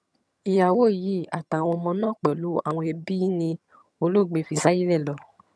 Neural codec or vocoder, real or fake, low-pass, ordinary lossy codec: vocoder, 22.05 kHz, 80 mel bands, HiFi-GAN; fake; none; none